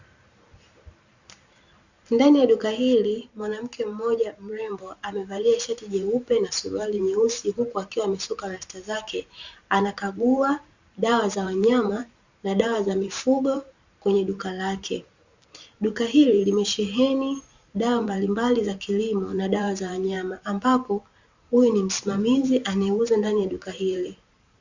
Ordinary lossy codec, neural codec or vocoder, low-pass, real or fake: Opus, 64 kbps; vocoder, 44.1 kHz, 128 mel bands every 512 samples, BigVGAN v2; 7.2 kHz; fake